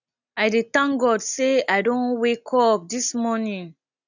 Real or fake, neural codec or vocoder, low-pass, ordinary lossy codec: real; none; 7.2 kHz; none